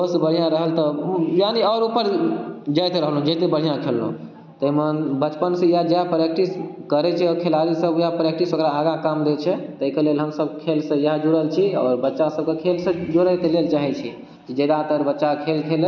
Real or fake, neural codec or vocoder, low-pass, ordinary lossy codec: real; none; 7.2 kHz; none